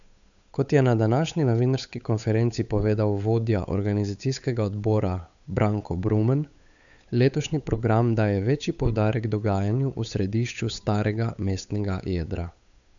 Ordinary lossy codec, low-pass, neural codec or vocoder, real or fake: none; 7.2 kHz; codec, 16 kHz, 8 kbps, FunCodec, trained on Chinese and English, 25 frames a second; fake